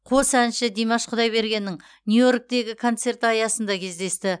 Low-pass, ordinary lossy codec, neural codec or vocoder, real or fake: 9.9 kHz; none; none; real